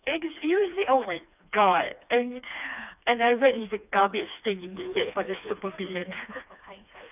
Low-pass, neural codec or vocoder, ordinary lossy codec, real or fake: 3.6 kHz; codec, 16 kHz, 2 kbps, FreqCodec, smaller model; none; fake